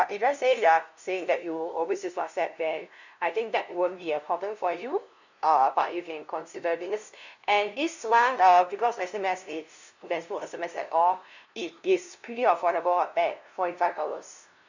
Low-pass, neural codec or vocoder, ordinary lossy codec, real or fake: 7.2 kHz; codec, 16 kHz, 0.5 kbps, FunCodec, trained on LibriTTS, 25 frames a second; none; fake